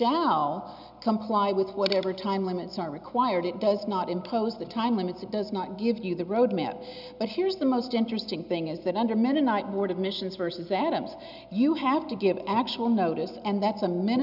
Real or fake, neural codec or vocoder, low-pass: real; none; 5.4 kHz